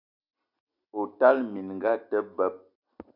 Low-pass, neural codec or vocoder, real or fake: 5.4 kHz; none; real